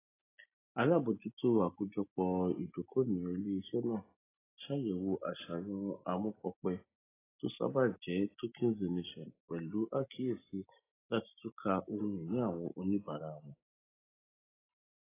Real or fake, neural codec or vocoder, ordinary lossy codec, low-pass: real; none; AAC, 16 kbps; 3.6 kHz